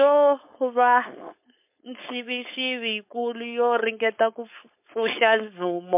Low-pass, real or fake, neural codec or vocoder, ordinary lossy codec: 3.6 kHz; fake; codec, 16 kHz, 4.8 kbps, FACodec; MP3, 24 kbps